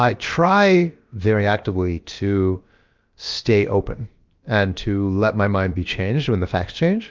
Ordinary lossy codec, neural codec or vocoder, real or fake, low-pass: Opus, 32 kbps; codec, 16 kHz, about 1 kbps, DyCAST, with the encoder's durations; fake; 7.2 kHz